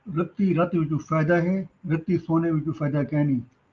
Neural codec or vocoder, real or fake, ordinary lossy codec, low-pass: none; real; Opus, 24 kbps; 7.2 kHz